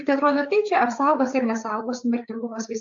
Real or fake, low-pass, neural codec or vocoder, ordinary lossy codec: fake; 7.2 kHz; codec, 16 kHz, 4 kbps, FreqCodec, larger model; AAC, 64 kbps